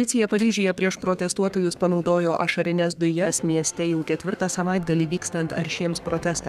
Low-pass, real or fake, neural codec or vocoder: 14.4 kHz; fake; codec, 32 kHz, 1.9 kbps, SNAC